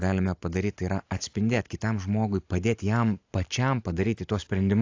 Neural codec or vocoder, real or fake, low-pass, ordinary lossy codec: none; real; 7.2 kHz; AAC, 48 kbps